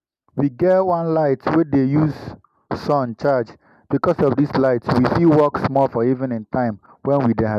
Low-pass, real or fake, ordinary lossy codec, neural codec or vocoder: 14.4 kHz; real; none; none